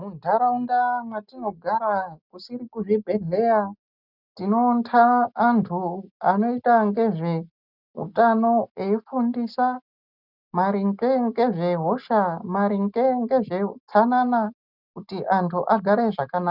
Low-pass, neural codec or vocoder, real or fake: 5.4 kHz; none; real